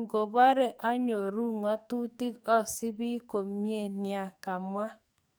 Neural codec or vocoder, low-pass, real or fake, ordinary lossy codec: codec, 44.1 kHz, 2.6 kbps, SNAC; none; fake; none